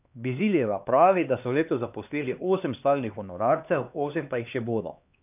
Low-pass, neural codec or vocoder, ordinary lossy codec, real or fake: 3.6 kHz; codec, 16 kHz, 2 kbps, X-Codec, HuBERT features, trained on LibriSpeech; none; fake